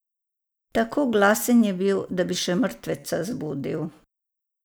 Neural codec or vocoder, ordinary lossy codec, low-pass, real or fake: none; none; none; real